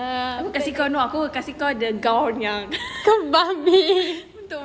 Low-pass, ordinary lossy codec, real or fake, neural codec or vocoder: none; none; real; none